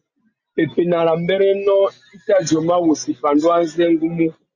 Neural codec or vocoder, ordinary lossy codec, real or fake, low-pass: none; Opus, 64 kbps; real; 7.2 kHz